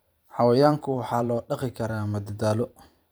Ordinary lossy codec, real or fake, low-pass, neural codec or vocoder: none; fake; none; vocoder, 44.1 kHz, 128 mel bands every 512 samples, BigVGAN v2